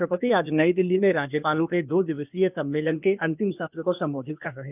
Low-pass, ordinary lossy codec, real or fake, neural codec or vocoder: 3.6 kHz; none; fake; codec, 16 kHz, 0.8 kbps, ZipCodec